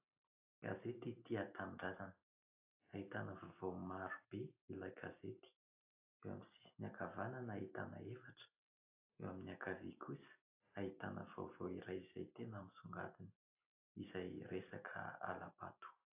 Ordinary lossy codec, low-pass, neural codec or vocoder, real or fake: AAC, 32 kbps; 3.6 kHz; none; real